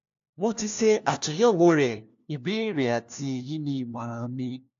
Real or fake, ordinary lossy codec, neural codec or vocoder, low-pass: fake; AAC, 64 kbps; codec, 16 kHz, 1 kbps, FunCodec, trained on LibriTTS, 50 frames a second; 7.2 kHz